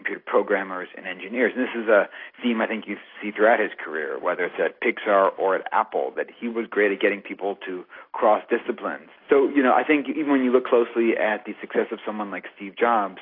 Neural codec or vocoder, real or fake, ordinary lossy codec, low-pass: none; real; AAC, 32 kbps; 5.4 kHz